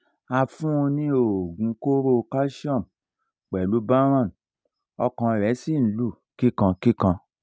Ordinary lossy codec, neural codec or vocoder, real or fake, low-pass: none; none; real; none